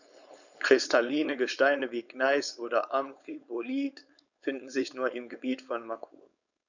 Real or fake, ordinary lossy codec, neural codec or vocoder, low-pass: fake; none; codec, 16 kHz, 4.8 kbps, FACodec; 7.2 kHz